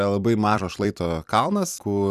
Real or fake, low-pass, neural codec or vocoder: fake; 14.4 kHz; vocoder, 44.1 kHz, 128 mel bands every 256 samples, BigVGAN v2